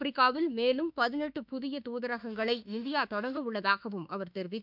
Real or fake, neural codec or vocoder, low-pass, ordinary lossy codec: fake; autoencoder, 48 kHz, 32 numbers a frame, DAC-VAE, trained on Japanese speech; 5.4 kHz; none